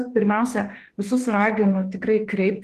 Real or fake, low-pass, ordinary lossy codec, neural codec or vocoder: fake; 14.4 kHz; Opus, 16 kbps; autoencoder, 48 kHz, 32 numbers a frame, DAC-VAE, trained on Japanese speech